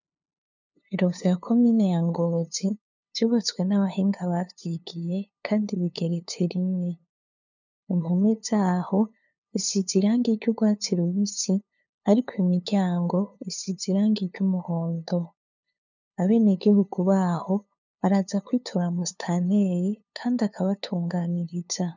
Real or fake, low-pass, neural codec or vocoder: fake; 7.2 kHz; codec, 16 kHz, 2 kbps, FunCodec, trained on LibriTTS, 25 frames a second